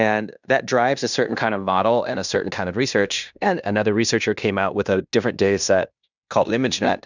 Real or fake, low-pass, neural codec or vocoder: fake; 7.2 kHz; codec, 16 kHz in and 24 kHz out, 0.9 kbps, LongCat-Audio-Codec, fine tuned four codebook decoder